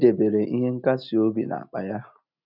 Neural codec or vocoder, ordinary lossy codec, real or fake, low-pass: none; none; real; 5.4 kHz